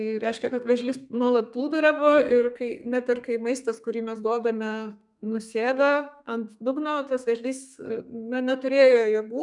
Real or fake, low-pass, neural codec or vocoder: fake; 10.8 kHz; codec, 32 kHz, 1.9 kbps, SNAC